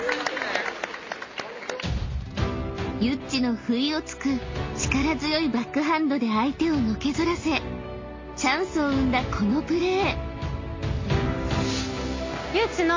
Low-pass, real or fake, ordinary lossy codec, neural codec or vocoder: 7.2 kHz; real; MP3, 32 kbps; none